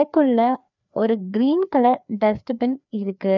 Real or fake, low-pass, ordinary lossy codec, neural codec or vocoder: fake; 7.2 kHz; none; codec, 16 kHz, 2 kbps, FreqCodec, larger model